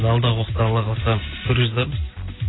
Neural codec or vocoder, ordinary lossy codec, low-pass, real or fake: none; AAC, 16 kbps; 7.2 kHz; real